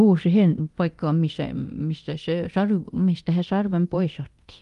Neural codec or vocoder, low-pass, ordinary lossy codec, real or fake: codec, 24 kHz, 0.9 kbps, DualCodec; 10.8 kHz; Opus, 32 kbps; fake